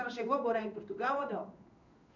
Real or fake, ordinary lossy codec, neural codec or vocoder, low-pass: fake; none; codec, 16 kHz in and 24 kHz out, 1 kbps, XY-Tokenizer; 7.2 kHz